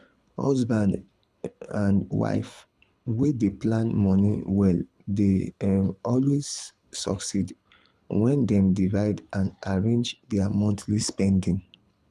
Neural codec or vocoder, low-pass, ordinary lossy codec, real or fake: codec, 24 kHz, 6 kbps, HILCodec; none; none; fake